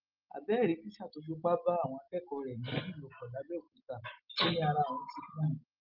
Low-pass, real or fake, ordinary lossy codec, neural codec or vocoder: 5.4 kHz; real; Opus, 24 kbps; none